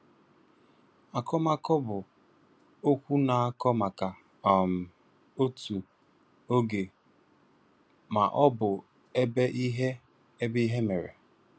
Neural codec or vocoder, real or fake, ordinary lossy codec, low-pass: none; real; none; none